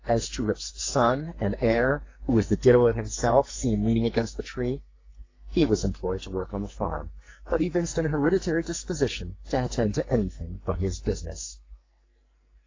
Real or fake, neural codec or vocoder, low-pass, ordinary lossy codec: fake; codec, 44.1 kHz, 2.6 kbps, SNAC; 7.2 kHz; AAC, 32 kbps